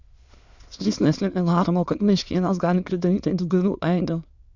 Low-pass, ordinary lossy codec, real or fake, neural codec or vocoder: 7.2 kHz; Opus, 64 kbps; fake; autoencoder, 22.05 kHz, a latent of 192 numbers a frame, VITS, trained on many speakers